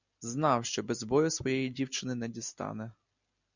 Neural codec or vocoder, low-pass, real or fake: none; 7.2 kHz; real